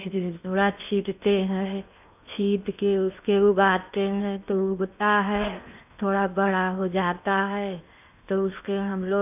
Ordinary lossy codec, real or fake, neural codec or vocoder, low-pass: none; fake; codec, 16 kHz in and 24 kHz out, 0.8 kbps, FocalCodec, streaming, 65536 codes; 3.6 kHz